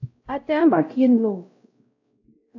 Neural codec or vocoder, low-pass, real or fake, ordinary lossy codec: codec, 16 kHz, 0.5 kbps, X-Codec, WavLM features, trained on Multilingual LibriSpeech; 7.2 kHz; fake; AAC, 48 kbps